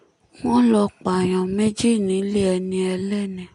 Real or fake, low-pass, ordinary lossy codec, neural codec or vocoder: real; 10.8 kHz; MP3, 96 kbps; none